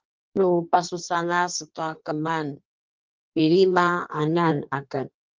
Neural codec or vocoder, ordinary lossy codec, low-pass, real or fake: codec, 16 kHz in and 24 kHz out, 1.1 kbps, FireRedTTS-2 codec; Opus, 32 kbps; 7.2 kHz; fake